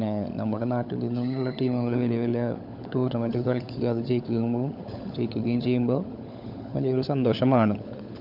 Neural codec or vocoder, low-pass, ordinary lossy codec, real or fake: codec, 16 kHz, 16 kbps, FunCodec, trained on LibriTTS, 50 frames a second; 5.4 kHz; none; fake